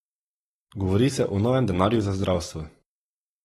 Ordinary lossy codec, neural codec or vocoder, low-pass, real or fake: AAC, 32 kbps; none; 14.4 kHz; real